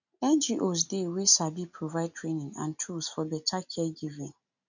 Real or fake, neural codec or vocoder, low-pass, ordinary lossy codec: real; none; 7.2 kHz; none